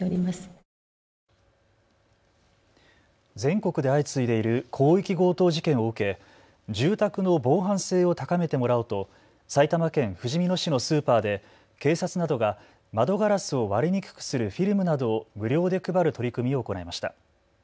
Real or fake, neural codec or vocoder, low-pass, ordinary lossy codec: real; none; none; none